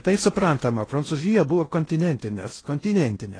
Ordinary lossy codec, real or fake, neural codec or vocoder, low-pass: AAC, 32 kbps; fake; codec, 16 kHz in and 24 kHz out, 0.6 kbps, FocalCodec, streaming, 2048 codes; 9.9 kHz